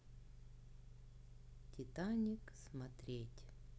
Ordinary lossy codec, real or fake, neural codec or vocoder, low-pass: none; real; none; none